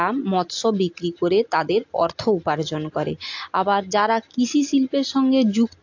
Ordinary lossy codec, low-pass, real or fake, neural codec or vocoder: AAC, 48 kbps; 7.2 kHz; real; none